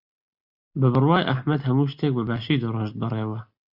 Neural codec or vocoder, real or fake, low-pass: none; real; 5.4 kHz